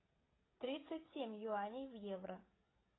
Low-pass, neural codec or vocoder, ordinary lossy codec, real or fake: 7.2 kHz; codec, 16 kHz, 16 kbps, FreqCodec, smaller model; AAC, 16 kbps; fake